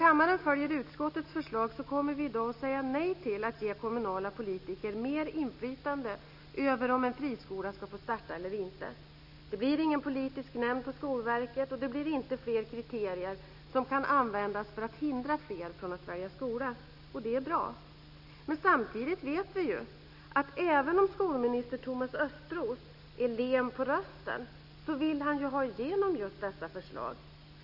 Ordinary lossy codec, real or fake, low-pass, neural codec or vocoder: none; real; 5.4 kHz; none